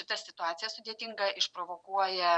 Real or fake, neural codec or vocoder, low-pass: fake; vocoder, 44.1 kHz, 128 mel bands every 256 samples, BigVGAN v2; 9.9 kHz